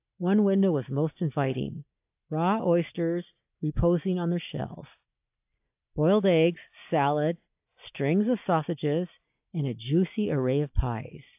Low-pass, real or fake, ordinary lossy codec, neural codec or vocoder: 3.6 kHz; real; AAC, 32 kbps; none